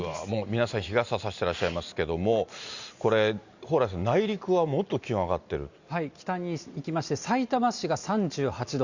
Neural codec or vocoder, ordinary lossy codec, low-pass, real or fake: none; Opus, 64 kbps; 7.2 kHz; real